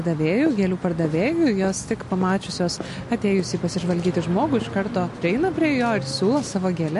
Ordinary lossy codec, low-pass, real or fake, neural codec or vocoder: MP3, 48 kbps; 14.4 kHz; real; none